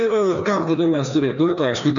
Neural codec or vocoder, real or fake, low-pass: codec, 16 kHz, 2 kbps, FreqCodec, larger model; fake; 7.2 kHz